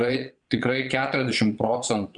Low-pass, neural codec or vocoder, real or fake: 9.9 kHz; vocoder, 22.05 kHz, 80 mel bands, Vocos; fake